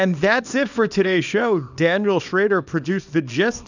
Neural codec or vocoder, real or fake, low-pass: codec, 24 kHz, 0.9 kbps, WavTokenizer, small release; fake; 7.2 kHz